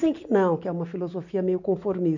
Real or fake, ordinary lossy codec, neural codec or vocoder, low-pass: real; none; none; 7.2 kHz